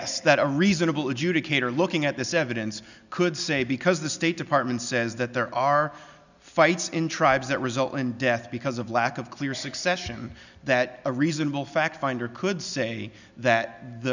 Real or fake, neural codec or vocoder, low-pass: real; none; 7.2 kHz